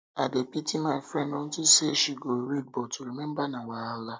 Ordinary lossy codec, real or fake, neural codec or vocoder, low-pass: none; real; none; none